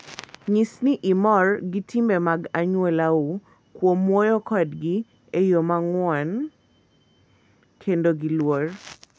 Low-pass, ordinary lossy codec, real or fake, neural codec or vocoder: none; none; real; none